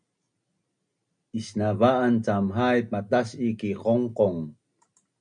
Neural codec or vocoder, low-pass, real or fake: none; 9.9 kHz; real